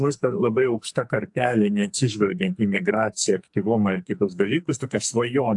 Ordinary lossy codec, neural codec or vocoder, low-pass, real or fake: AAC, 64 kbps; codec, 44.1 kHz, 2.6 kbps, SNAC; 10.8 kHz; fake